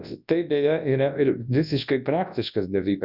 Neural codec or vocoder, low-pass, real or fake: codec, 24 kHz, 0.9 kbps, WavTokenizer, large speech release; 5.4 kHz; fake